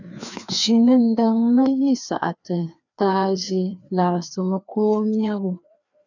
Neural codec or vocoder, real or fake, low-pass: codec, 16 kHz, 2 kbps, FreqCodec, larger model; fake; 7.2 kHz